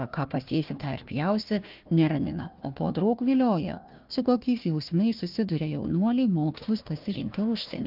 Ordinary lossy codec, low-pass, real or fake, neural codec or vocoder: Opus, 24 kbps; 5.4 kHz; fake; codec, 16 kHz, 1 kbps, FunCodec, trained on Chinese and English, 50 frames a second